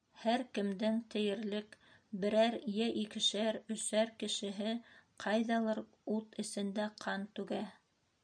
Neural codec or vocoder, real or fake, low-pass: none; real; 9.9 kHz